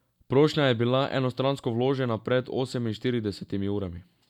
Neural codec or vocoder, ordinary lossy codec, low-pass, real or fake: none; none; 19.8 kHz; real